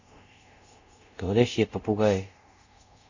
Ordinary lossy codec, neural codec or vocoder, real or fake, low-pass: Opus, 64 kbps; codec, 24 kHz, 0.5 kbps, DualCodec; fake; 7.2 kHz